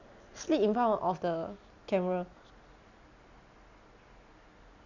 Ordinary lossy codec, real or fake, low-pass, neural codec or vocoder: none; real; 7.2 kHz; none